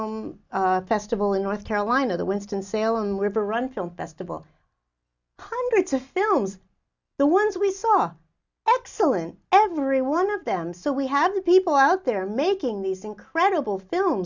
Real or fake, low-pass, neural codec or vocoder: real; 7.2 kHz; none